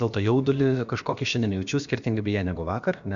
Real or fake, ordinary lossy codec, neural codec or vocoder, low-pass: fake; Opus, 64 kbps; codec, 16 kHz, about 1 kbps, DyCAST, with the encoder's durations; 7.2 kHz